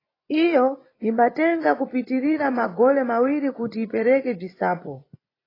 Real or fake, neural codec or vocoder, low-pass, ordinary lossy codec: fake; vocoder, 24 kHz, 100 mel bands, Vocos; 5.4 kHz; AAC, 24 kbps